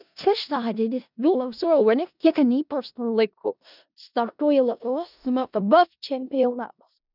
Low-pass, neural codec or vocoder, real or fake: 5.4 kHz; codec, 16 kHz in and 24 kHz out, 0.4 kbps, LongCat-Audio-Codec, four codebook decoder; fake